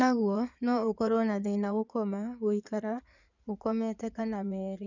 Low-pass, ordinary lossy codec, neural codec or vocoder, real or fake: 7.2 kHz; none; codec, 16 kHz in and 24 kHz out, 2.2 kbps, FireRedTTS-2 codec; fake